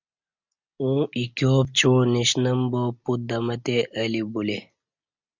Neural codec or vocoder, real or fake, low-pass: none; real; 7.2 kHz